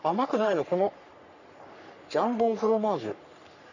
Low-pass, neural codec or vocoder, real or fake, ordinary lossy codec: 7.2 kHz; codec, 44.1 kHz, 3.4 kbps, Pupu-Codec; fake; none